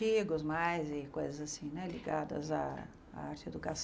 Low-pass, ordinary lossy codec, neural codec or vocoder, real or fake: none; none; none; real